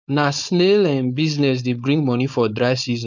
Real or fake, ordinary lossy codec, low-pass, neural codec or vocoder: fake; none; 7.2 kHz; codec, 16 kHz, 4.8 kbps, FACodec